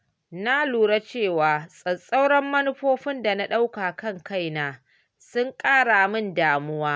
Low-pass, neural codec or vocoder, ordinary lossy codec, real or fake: none; none; none; real